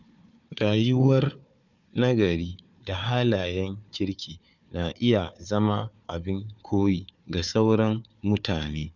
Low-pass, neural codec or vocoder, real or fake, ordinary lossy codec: 7.2 kHz; codec, 16 kHz, 4 kbps, FunCodec, trained on Chinese and English, 50 frames a second; fake; none